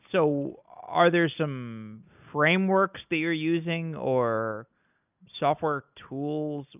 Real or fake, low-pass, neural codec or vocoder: real; 3.6 kHz; none